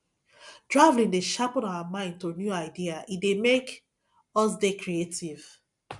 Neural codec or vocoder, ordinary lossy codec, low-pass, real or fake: none; none; 10.8 kHz; real